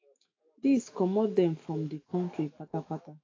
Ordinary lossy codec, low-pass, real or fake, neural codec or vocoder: AAC, 32 kbps; 7.2 kHz; fake; autoencoder, 48 kHz, 128 numbers a frame, DAC-VAE, trained on Japanese speech